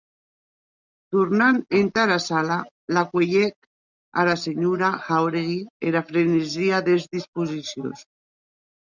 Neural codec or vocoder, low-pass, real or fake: none; 7.2 kHz; real